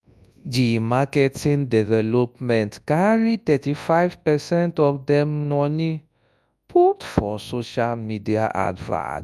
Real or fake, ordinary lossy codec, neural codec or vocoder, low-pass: fake; none; codec, 24 kHz, 0.9 kbps, WavTokenizer, large speech release; none